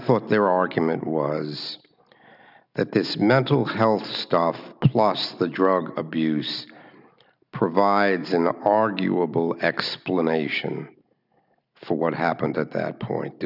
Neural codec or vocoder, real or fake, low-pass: none; real; 5.4 kHz